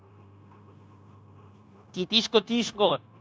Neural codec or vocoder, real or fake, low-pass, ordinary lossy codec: codec, 16 kHz, 0.9 kbps, LongCat-Audio-Codec; fake; none; none